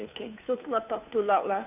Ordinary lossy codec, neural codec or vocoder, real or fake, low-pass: none; codec, 16 kHz, 2 kbps, FunCodec, trained on Chinese and English, 25 frames a second; fake; 3.6 kHz